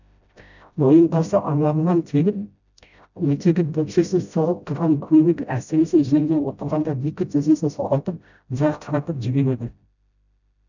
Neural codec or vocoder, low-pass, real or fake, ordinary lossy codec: codec, 16 kHz, 0.5 kbps, FreqCodec, smaller model; 7.2 kHz; fake; none